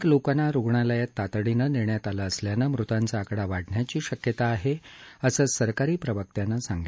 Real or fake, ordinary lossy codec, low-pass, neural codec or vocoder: real; none; none; none